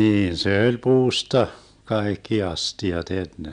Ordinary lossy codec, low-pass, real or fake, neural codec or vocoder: none; 9.9 kHz; real; none